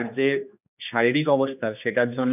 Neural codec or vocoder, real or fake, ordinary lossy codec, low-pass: codec, 16 kHz, 2 kbps, X-Codec, HuBERT features, trained on general audio; fake; none; 3.6 kHz